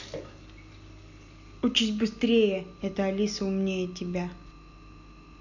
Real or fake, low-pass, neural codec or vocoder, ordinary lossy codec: real; 7.2 kHz; none; none